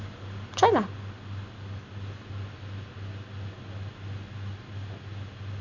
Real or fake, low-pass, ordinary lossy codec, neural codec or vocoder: real; 7.2 kHz; none; none